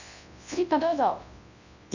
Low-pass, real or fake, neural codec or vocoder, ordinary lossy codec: 7.2 kHz; fake; codec, 24 kHz, 0.9 kbps, WavTokenizer, large speech release; none